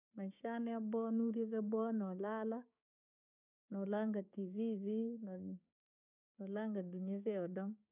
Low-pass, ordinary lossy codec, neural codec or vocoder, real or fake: 3.6 kHz; none; codec, 16 kHz, 6 kbps, DAC; fake